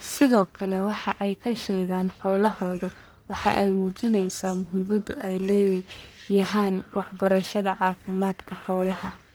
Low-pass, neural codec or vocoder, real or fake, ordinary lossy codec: none; codec, 44.1 kHz, 1.7 kbps, Pupu-Codec; fake; none